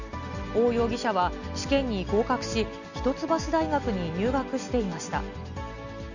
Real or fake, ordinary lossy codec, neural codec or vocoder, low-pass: real; none; none; 7.2 kHz